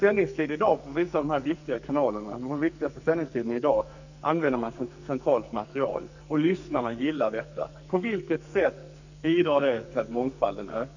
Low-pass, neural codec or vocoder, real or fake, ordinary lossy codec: 7.2 kHz; codec, 44.1 kHz, 2.6 kbps, SNAC; fake; none